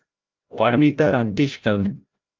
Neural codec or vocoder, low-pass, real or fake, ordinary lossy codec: codec, 16 kHz, 0.5 kbps, FreqCodec, larger model; 7.2 kHz; fake; Opus, 24 kbps